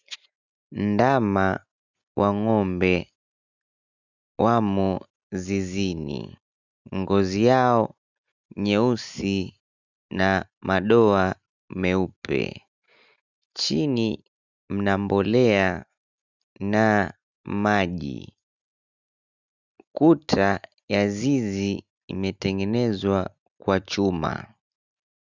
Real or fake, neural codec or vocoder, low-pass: real; none; 7.2 kHz